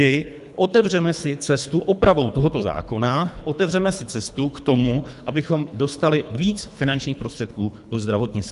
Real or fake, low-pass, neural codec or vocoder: fake; 10.8 kHz; codec, 24 kHz, 3 kbps, HILCodec